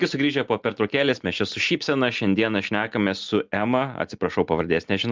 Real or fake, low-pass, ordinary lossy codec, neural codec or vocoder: real; 7.2 kHz; Opus, 24 kbps; none